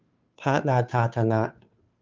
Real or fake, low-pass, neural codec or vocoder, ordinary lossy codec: fake; 7.2 kHz; codec, 16 kHz, 2 kbps, FunCodec, trained on Chinese and English, 25 frames a second; Opus, 32 kbps